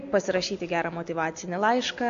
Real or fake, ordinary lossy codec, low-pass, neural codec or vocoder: real; AAC, 96 kbps; 7.2 kHz; none